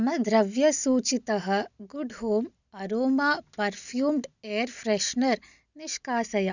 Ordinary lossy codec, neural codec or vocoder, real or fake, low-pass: none; none; real; 7.2 kHz